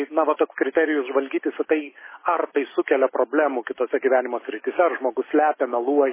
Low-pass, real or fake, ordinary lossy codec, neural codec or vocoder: 3.6 kHz; real; MP3, 16 kbps; none